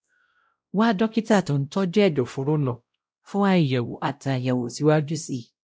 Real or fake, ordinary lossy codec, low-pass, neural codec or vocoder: fake; none; none; codec, 16 kHz, 0.5 kbps, X-Codec, WavLM features, trained on Multilingual LibriSpeech